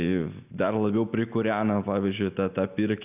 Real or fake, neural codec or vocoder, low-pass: real; none; 3.6 kHz